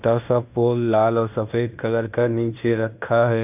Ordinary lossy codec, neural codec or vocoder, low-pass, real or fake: none; codec, 16 kHz in and 24 kHz out, 0.9 kbps, LongCat-Audio-Codec, fine tuned four codebook decoder; 3.6 kHz; fake